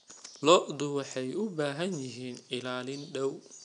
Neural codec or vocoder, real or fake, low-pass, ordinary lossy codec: none; real; 9.9 kHz; none